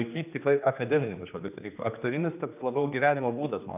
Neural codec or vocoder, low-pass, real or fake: codec, 16 kHz, 2 kbps, X-Codec, HuBERT features, trained on general audio; 3.6 kHz; fake